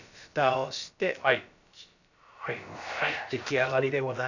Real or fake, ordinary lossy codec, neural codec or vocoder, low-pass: fake; none; codec, 16 kHz, about 1 kbps, DyCAST, with the encoder's durations; 7.2 kHz